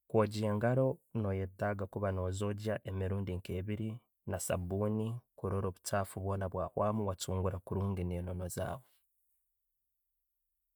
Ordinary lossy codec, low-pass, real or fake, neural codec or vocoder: none; none; real; none